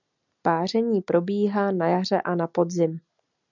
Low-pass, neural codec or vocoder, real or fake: 7.2 kHz; none; real